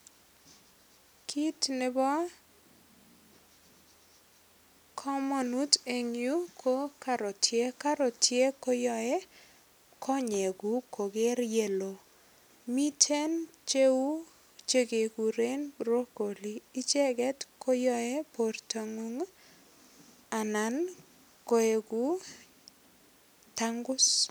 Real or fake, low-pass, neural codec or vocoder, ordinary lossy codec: real; none; none; none